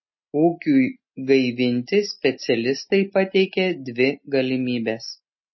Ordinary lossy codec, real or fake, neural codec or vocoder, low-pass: MP3, 24 kbps; real; none; 7.2 kHz